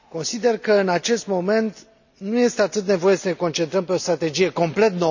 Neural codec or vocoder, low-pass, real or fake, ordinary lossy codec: none; 7.2 kHz; real; none